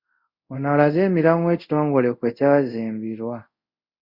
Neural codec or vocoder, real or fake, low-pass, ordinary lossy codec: codec, 24 kHz, 0.5 kbps, DualCodec; fake; 5.4 kHz; Opus, 64 kbps